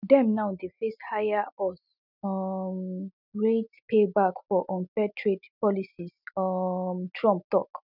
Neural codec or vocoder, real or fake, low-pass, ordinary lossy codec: none; real; 5.4 kHz; none